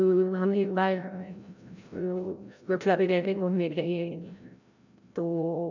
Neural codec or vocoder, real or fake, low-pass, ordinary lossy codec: codec, 16 kHz, 0.5 kbps, FreqCodec, larger model; fake; 7.2 kHz; none